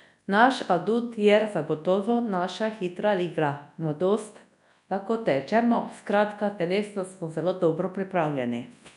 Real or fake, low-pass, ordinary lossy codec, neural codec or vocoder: fake; 10.8 kHz; none; codec, 24 kHz, 0.9 kbps, WavTokenizer, large speech release